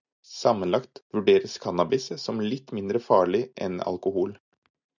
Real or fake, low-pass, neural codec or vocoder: real; 7.2 kHz; none